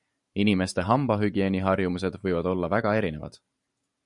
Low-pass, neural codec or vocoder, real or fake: 10.8 kHz; vocoder, 44.1 kHz, 128 mel bands every 512 samples, BigVGAN v2; fake